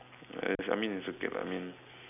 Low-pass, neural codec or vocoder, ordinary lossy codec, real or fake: 3.6 kHz; none; none; real